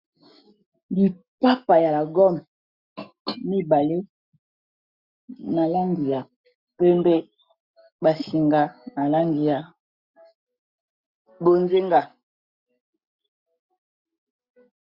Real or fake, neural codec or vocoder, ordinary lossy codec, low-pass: real; none; Opus, 64 kbps; 5.4 kHz